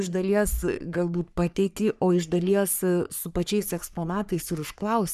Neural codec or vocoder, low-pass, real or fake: codec, 44.1 kHz, 3.4 kbps, Pupu-Codec; 14.4 kHz; fake